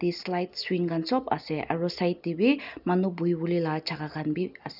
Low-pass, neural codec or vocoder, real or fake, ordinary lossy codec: 5.4 kHz; none; real; none